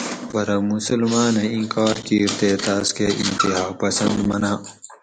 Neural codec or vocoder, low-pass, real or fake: none; 9.9 kHz; real